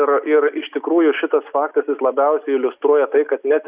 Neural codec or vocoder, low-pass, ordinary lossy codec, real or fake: none; 3.6 kHz; Opus, 64 kbps; real